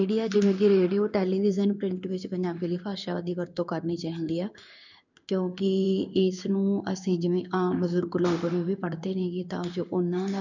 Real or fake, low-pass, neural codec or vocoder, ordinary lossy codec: fake; 7.2 kHz; codec, 16 kHz in and 24 kHz out, 1 kbps, XY-Tokenizer; none